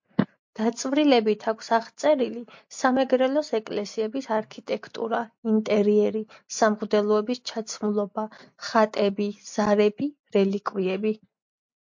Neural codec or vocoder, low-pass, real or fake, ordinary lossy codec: none; 7.2 kHz; real; MP3, 48 kbps